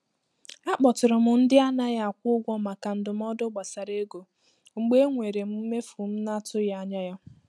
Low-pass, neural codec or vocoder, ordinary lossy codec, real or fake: none; none; none; real